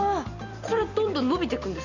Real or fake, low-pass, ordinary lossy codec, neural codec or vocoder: real; 7.2 kHz; Opus, 64 kbps; none